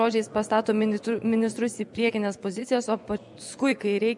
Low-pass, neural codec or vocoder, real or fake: 10.8 kHz; vocoder, 24 kHz, 100 mel bands, Vocos; fake